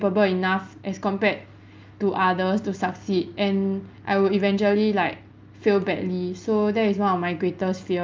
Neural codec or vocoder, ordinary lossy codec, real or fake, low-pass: none; Opus, 24 kbps; real; 7.2 kHz